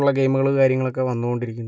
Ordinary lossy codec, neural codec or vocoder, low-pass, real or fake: none; none; none; real